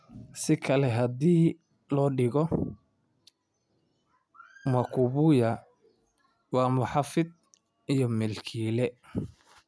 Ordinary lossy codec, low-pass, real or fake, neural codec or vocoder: none; none; real; none